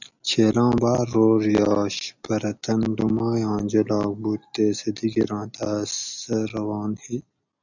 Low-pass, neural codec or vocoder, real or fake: 7.2 kHz; none; real